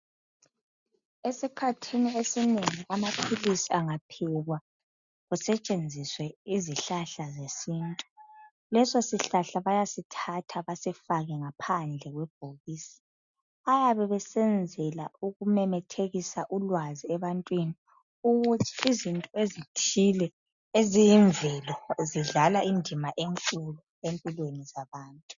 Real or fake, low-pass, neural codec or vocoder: real; 7.2 kHz; none